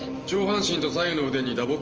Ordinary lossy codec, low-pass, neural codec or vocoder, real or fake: Opus, 24 kbps; 7.2 kHz; vocoder, 44.1 kHz, 128 mel bands every 512 samples, BigVGAN v2; fake